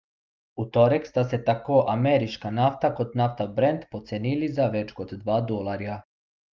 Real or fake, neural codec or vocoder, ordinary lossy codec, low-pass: real; none; Opus, 24 kbps; 7.2 kHz